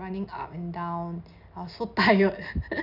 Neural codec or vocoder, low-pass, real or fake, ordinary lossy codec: none; 5.4 kHz; real; none